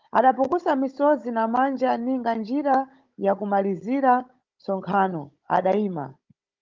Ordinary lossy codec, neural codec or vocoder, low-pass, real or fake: Opus, 24 kbps; codec, 16 kHz, 16 kbps, FunCodec, trained on Chinese and English, 50 frames a second; 7.2 kHz; fake